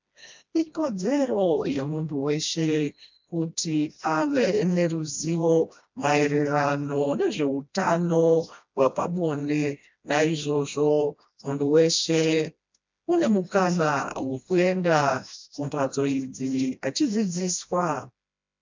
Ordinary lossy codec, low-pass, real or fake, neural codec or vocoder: MP3, 64 kbps; 7.2 kHz; fake; codec, 16 kHz, 1 kbps, FreqCodec, smaller model